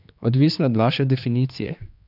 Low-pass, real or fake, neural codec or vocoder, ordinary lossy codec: 5.4 kHz; fake; codec, 16 kHz, 2 kbps, X-Codec, HuBERT features, trained on general audio; none